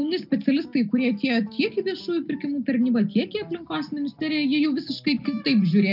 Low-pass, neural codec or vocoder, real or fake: 5.4 kHz; none; real